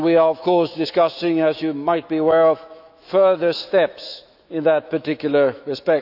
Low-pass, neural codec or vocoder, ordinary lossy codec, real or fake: 5.4 kHz; autoencoder, 48 kHz, 128 numbers a frame, DAC-VAE, trained on Japanese speech; none; fake